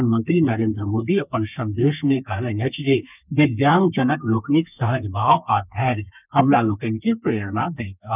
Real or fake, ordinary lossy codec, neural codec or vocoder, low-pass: fake; none; codec, 32 kHz, 1.9 kbps, SNAC; 3.6 kHz